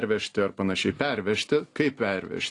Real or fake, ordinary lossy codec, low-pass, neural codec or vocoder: real; AAC, 48 kbps; 10.8 kHz; none